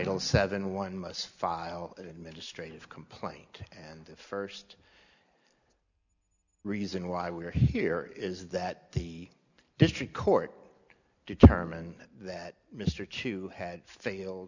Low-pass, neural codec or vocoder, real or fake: 7.2 kHz; none; real